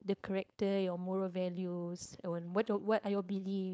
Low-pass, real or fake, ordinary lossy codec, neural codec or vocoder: none; fake; none; codec, 16 kHz, 4.8 kbps, FACodec